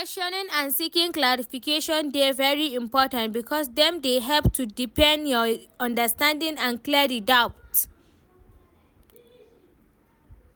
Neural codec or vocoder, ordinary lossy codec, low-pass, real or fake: none; none; none; real